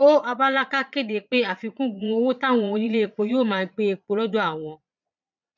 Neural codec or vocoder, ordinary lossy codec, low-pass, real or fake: vocoder, 22.05 kHz, 80 mel bands, Vocos; none; 7.2 kHz; fake